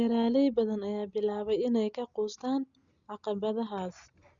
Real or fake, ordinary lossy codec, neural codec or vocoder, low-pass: real; Opus, 64 kbps; none; 7.2 kHz